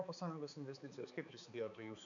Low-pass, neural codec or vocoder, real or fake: 7.2 kHz; codec, 16 kHz, 4 kbps, X-Codec, HuBERT features, trained on balanced general audio; fake